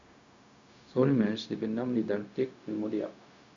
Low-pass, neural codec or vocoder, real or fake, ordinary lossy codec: 7.2 kHz; codec, 16 kHz, 0.4 kbps, LongCat-Audio-Codec; fake; Opus, 64 kbps